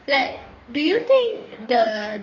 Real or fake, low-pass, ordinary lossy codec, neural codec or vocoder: fake; 7.2 kHz; none; codec, 44.1 kHz, 2.6 kbps, DAC